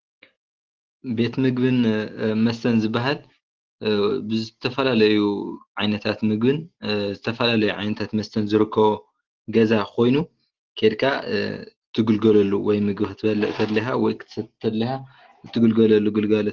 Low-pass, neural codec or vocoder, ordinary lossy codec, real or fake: 7.2 kHz; none; Opus, 16 kbps; real